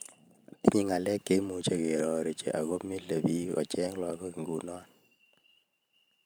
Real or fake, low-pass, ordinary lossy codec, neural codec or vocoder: fake; none; none; vocoder, 44.1 kHz, 128 mel bands every 512 samples, BigVGAN v2